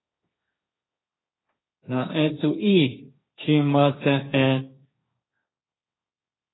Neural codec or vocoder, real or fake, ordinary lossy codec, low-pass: codec, 16 kHz, 1.1 kbps, Voila-Tokenizer; fake; AAC, 16 kbps; 7.2 kHz